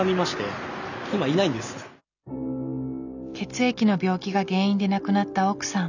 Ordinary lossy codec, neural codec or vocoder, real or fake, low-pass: none; none; real; 7.2 kHz